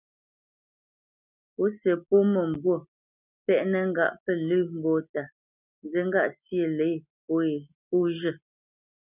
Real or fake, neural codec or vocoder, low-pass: real; none; 3.6 kHz